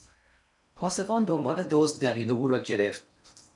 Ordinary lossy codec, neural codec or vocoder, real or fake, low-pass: MP3, 96 kbps; codec, 16 kHz in and 24 kHz out, 0.6 kbps, FocalCodec, streaming, 4096 codes; fake; 10.8 kHz